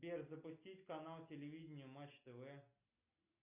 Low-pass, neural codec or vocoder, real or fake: 3.6 kHz; none; real